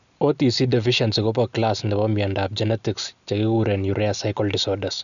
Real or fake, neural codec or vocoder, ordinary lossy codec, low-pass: real; none; none; 7.2 kHz